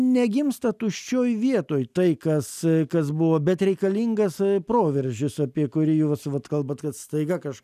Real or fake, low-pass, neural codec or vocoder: real; 14.4 kHz; none